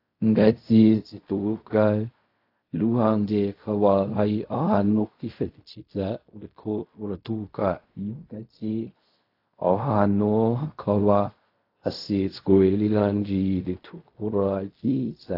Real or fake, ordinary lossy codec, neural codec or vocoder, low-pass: fake; AAC, 32 kbps; codec, 16 kHz in and 24 kHz out, 0.4 kbps, LongCat-Audio-Codec, fine tuned four codebook decoder; 5.4 kHz